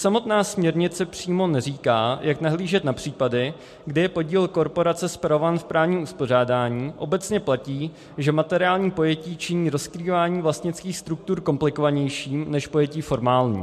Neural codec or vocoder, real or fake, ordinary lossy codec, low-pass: none; real; MP3, 64 kbps; 14.4 kHz